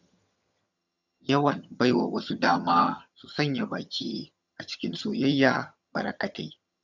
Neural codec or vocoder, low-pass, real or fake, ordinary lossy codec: vocoder, 22.05 kHz, 80 mel bands, HiFi-GAN; 7.2 kHz; fake; none